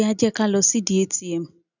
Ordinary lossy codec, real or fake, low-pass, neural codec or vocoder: none; real; 7.2 kHz; none